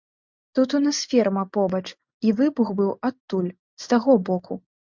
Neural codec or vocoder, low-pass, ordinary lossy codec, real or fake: none; 7.2 kHz; MP3, 64 kbps; real